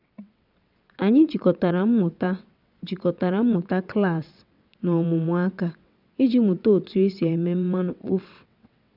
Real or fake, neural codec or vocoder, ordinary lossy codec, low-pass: fake; vocoder, 22.05 kHz, 80 mel bands, WaveNeXt; none; 5.4 kHz